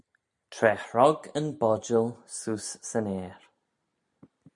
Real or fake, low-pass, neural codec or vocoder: real; 10.8 kHz; none